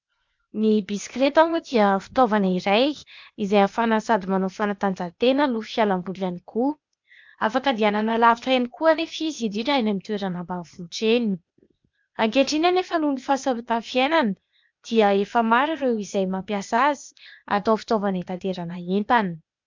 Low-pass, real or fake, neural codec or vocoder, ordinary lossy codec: 7.2 kHz; fake; codec, 16 kHz, 0.8 kbps, ZipCodec; MP3, 64 kbps